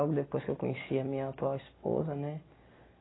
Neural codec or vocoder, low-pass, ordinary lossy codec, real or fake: none; 7.2 kHz; AAC, 16 kbps; real